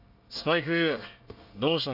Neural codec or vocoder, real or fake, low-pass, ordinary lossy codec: codec, 24 kHz, 1 kbps, SNAC; fake; 5.4 kHz; none